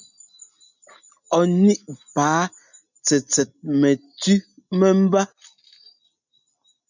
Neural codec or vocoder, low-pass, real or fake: none; 7.2 kHz; real